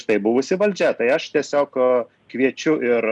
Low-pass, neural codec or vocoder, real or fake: 10.8 kHz; none; real